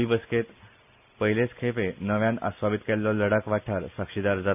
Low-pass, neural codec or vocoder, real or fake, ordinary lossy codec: 3.6 kHz; none; real; none